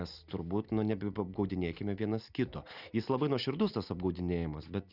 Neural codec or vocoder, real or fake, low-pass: none; real; 5.4 kHz